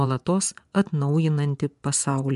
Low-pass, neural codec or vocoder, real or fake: 10.8 kHz; vocoder, 24 kHz, 100 mel bands, Vocos; fake